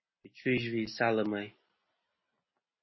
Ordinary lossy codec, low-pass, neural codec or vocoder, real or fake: MP3, 24 kbps; 7.2 kHz; none; real